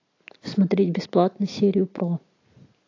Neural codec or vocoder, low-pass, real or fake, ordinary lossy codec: none; 7.2 kHz; real; AAC, 32 kbps